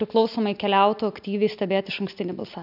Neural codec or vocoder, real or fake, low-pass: none; real; 5.4 kHz